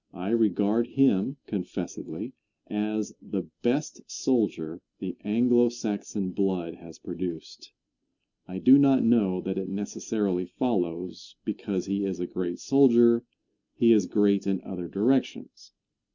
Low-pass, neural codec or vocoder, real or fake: 7.2 kHz; none; real